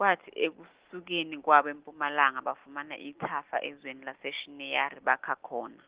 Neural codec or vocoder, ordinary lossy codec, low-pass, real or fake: none; Opus, 16 kbps; 3.6 kHz; real